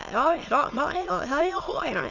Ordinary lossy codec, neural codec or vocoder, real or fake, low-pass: none; autoencoder, 22.05 kHz, a latent of 192 numbers a frame, VITS, trained on many speakers; fake; 7.2 kHz